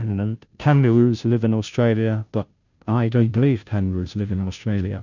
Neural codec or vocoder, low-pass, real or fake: codec, 16 kHz, 0.5 kbps, FunCodec, trained on Chinese and English, 25 frames a second; 7.2 kHz; fake